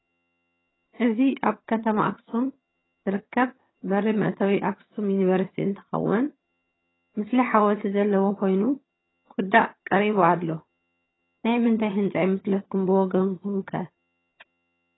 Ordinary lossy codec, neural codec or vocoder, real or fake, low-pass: AAC, 16 kbps; vocoder, 22.05 kHz, 80 mel bands, HiFi-GAN; fake; 7.2 kHz